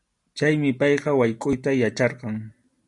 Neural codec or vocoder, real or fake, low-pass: none; real; 10.8 kHz